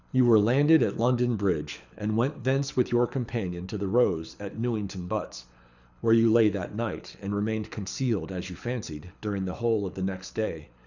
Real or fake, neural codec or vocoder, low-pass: fake; codec, 24 kHz, 6 kbps, HILCodec; 7.2 kHz